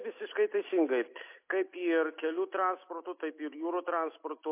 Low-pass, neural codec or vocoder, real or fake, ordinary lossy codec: 3.6 kHz; none; real; MP3, 24 kbps